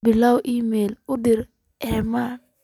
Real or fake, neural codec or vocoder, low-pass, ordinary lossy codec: real; none; 19.8 kHz; none